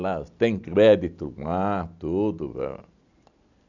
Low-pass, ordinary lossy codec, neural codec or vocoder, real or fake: 7.2 kHz; none; none; real